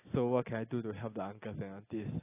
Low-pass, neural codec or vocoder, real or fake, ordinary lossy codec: 3.6 kHz; none; real; AAC, 16 kbps